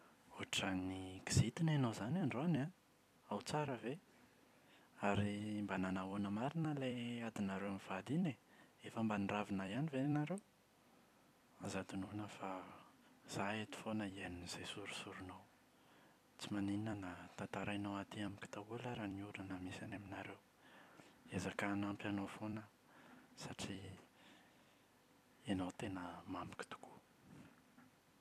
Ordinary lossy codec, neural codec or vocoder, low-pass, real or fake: none; vocoder, 44.1 kHz, 128 mel bands every 256 samples, BigVGAN v2; 14.4 kHz; fake